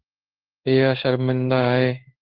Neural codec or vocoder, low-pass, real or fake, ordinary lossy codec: codec, 16 kHz in and 24 kHz out, 1 kbps, XY-Tokenizer; 5.4 kHz; fake; Opus, 16 kbps